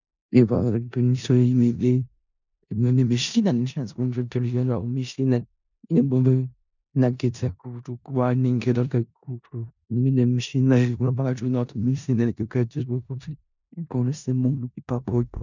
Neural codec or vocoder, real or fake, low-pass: codec, 16 kHz in and 24 kHz out, 0.4 kbps, LongCat-Audio-Codec, four codebook decoder; fake; 7.2 kHz